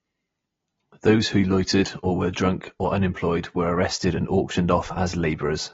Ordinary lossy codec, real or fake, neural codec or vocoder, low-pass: AAC, 24 kbps; real; none; 7.2 kHz